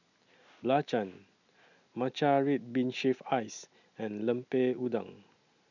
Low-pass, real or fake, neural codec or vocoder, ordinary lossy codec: 7.2 kHz; real; none; none